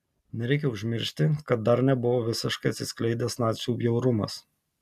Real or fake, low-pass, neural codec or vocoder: real; 14.4 kHz; none